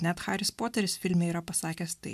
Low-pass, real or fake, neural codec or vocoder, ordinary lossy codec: 14.4 kHz; real; none; MP3, 96 kbps